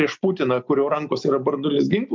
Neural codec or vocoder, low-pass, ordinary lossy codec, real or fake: none; 7.2 kHz; MP3, 64 kbps; real